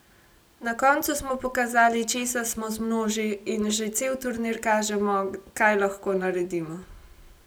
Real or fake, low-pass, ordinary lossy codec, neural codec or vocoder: real; none; none; none